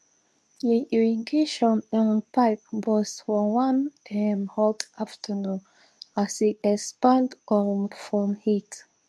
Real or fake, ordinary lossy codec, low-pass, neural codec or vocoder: fake; none; none; codec, 24 kHz, 0.9 kbps, WavTokenizer, medium speech release version 2